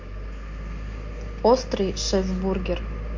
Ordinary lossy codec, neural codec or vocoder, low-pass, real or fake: MP3, 48 kbps; none; 7.2 kHz; real